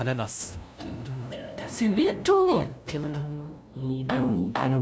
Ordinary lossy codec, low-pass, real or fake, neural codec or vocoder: none; none; fake; codec, 16 kHz, 0.5 kbps, FunCodec, trained on LibriTTS, 25 frames a second